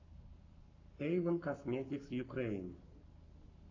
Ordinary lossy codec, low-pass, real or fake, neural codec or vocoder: AAC, 48 kbps; 7.2 kHz; fake; codec, 44.1 kHz, 7.8 kbps, Pupu-Codec